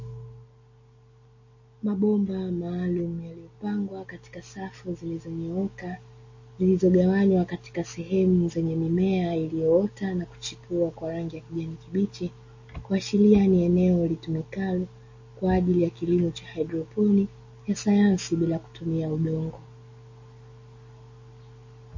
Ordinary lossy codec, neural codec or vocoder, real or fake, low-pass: MP3, 32 kbps; none; real; 7.2 kHz